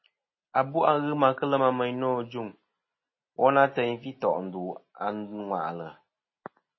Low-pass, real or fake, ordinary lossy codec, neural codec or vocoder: 7.2 kHz; real; MP3, 24 kbps; none